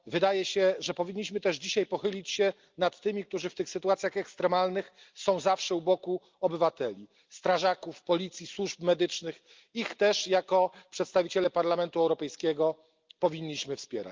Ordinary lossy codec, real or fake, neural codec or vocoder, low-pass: Opus, 32 kbps; real; none; 7.2 kHz